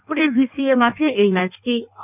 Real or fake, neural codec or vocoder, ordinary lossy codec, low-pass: fake; codec, 16 kHz in and 24 kHz out, 1.1 kbps, FireRedTTS-2 codec; none; 3.6 kHz